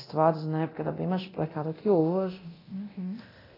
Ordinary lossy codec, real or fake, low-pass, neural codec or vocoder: AAC, 24 kbps; fake; 5.4 kHz; codec, 24 kHz, 0.9 kbps, DualCodec